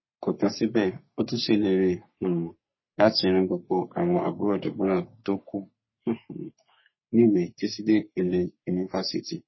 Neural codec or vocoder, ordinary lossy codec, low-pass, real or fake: codec, 44.1 kHz, 3.4 kbps, Pupu-Codec; MP3, 24 kbps; 7.2 kHz; fake